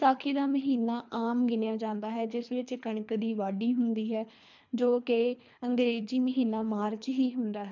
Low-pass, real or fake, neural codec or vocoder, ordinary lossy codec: 7.2 kHz; fake; codec, 24 kHz, 3 kbps, HILCodec; MP3, 64 kbps